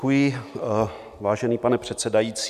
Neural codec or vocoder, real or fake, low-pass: none; real; 14.4 kHz